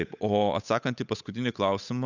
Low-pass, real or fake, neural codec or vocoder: 7.2 kHz; fake; codec, 16 kHz, 8 kbps, FunCodec, trained on Chinese and English, 25 frames a second